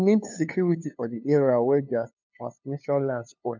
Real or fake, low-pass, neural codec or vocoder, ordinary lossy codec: fake; 7.2 kHz; codec, 16 kHz, 2 kbps, FunCodec, trained on LibriTTS, 25 frames a second; MP3, 64 kbps